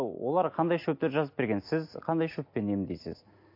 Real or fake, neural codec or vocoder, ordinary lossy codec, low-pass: real; none; MP3, 32 kbps; 5.4 kHz